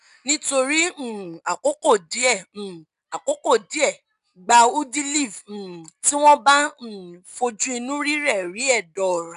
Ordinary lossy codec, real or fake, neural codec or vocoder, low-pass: none; real; none; 10.8 kHz